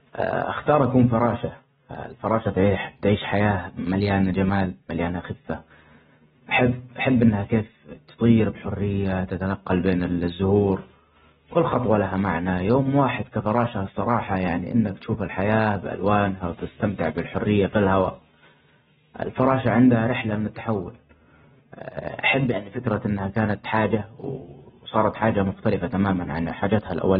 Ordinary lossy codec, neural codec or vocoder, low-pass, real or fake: AAC, 16 kbps; none; 10.8 kHz; real